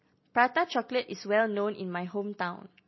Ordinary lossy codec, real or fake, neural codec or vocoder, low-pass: MP3, 24 kbps; real; none; 7.2 kHz